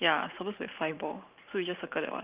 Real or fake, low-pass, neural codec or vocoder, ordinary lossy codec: fake; 3.6 kHz; vocoder, 44.1 kHz, 128 mel bands every 512 samples, BigVGAN v2; Opus, 24 kbps